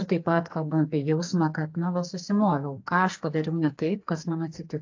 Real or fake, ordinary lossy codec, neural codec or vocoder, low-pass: fake; AAC, 48 kbps; codec, 44.1 kHz, 2.6 kbps, SNAC; 7.2 kHz